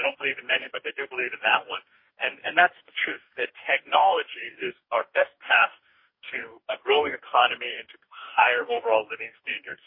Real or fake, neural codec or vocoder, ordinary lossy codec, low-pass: fake; codec, 44.1 kHz, 2.6 kbps, DAC; MP3, 24 kbps; 5.4 kHz